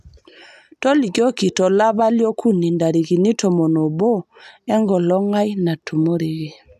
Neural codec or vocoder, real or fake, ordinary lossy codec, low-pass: none; real; AAC, 96 kbps; 14.4 kHz